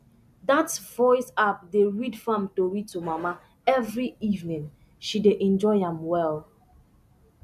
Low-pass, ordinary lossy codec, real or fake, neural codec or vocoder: 14.4 kHz; none; real; none